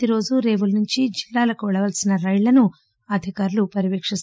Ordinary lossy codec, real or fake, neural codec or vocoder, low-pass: none; real; none; none